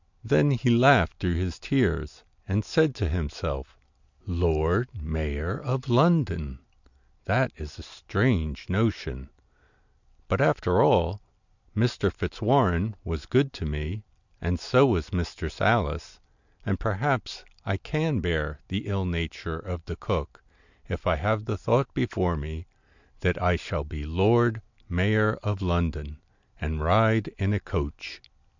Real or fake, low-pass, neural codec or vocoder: real; 7.2 kHz; none